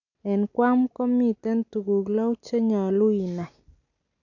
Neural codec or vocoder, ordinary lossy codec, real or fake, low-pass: none; none; real; 7.2 kHz